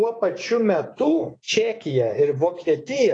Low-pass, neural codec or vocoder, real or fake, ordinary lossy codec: 9.9 kHz; codec, 24 kHz, 3.1 kbps, DualCodec; fake; AAC, 32 kbps